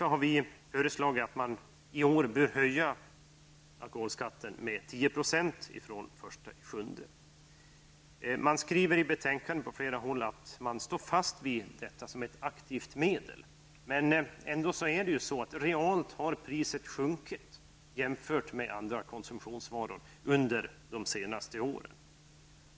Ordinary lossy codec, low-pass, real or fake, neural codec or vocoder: none; none; real; none